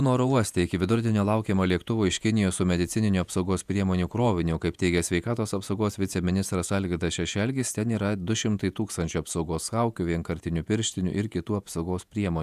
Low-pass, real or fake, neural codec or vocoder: 14.4 kHz; fake; vocoder, 48 kHz, 128 mel bands, Vocos